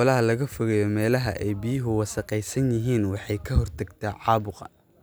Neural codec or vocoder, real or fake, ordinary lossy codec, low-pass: none; real; none; none